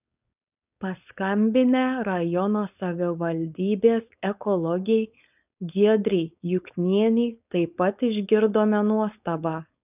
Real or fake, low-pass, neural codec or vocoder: fake; 3.6 kHz; codec, 16 kHz, 4.8 kbps, FACodec